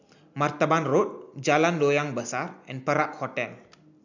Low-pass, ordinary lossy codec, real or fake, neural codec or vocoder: 7.2 kHz; none; real; none